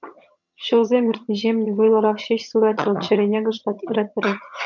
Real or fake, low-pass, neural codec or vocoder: fake; 7.2 kHz; vocoder, 22.05 kHz, 80 mel bands, HiFi-GAN